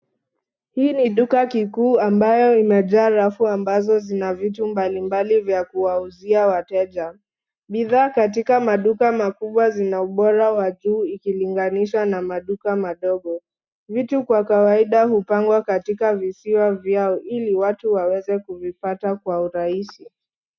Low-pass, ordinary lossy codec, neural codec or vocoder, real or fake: 7.2 kHz; MP3, 64 kbps; none; real